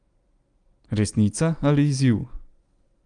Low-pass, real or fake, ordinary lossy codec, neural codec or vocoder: 9.9 kHz; real; Opus, 32 kbps; none